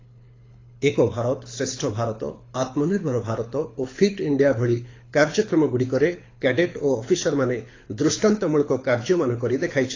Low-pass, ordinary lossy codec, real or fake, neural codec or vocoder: 7.2 kHz; AAC, 32 kbps; fake; codec, 24 kHz, 6 kbps, HILCodec